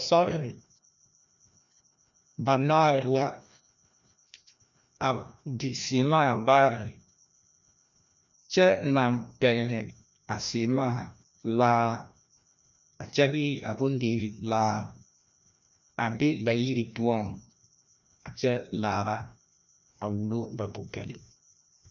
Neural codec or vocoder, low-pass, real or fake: codec, 16 kHz, 1 kbps, FreqCodec, larger model; 7.2 kHz; fake